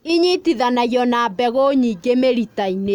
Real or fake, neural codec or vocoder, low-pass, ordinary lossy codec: real; none; 19.8 kHz; none